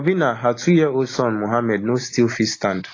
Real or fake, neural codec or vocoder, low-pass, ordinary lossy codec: real; none; 7.2 kHz; AAC, 32 kbps